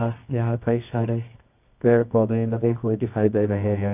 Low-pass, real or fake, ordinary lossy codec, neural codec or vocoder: 3.6 kHz; fake; none; codec, 24 kHz, 0.9 kbps, WavTokenizer, medium music audio release